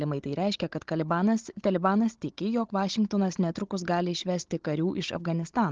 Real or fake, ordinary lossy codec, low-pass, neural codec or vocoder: fake; Opus, 16 kbps; 7.2 kHz; codec, 16 kHz, 16 kbps, FunCodec, trained on Chinese and English, 50 frames a second